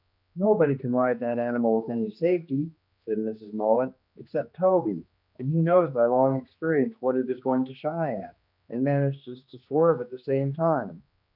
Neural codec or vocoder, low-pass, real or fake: codec, 16 kHz, 2 kbps, X-Codec, HuBERT features, trained on general audio; 5.4 kHz; fake